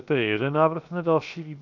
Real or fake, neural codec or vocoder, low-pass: fake; codec, 16 kHz, 0.7 kbps, FocalCodec; 7.2 kHz